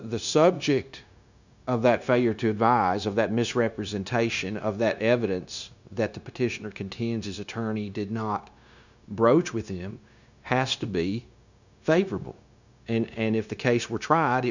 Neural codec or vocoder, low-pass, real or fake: codec, 16 kHz, 0.9 kbps, LongCat-Audio-Codec; 7.2 kHz; fake